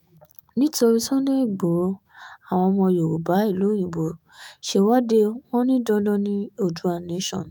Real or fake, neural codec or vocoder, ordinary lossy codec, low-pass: fake; autoencoder, 48 kHz, 128 numbers a frame, DAC-VAE, trained on Japanese speech; none; 19.8 kHz